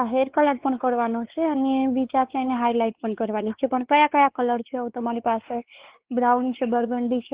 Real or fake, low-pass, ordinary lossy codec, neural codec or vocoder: fake; 3.6 kHz; Opus, 16 kbps; codec, 16 kHz, 4 kbps, X-Codec, WavLM features, trained on Multilingual LibriSpeech